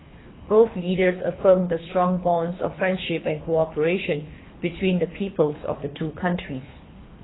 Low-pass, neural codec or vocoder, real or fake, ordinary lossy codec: 7.2 kHz; codec, 24 kHz, 3 kbps, HILCodec; fake; AAC, 16 kbps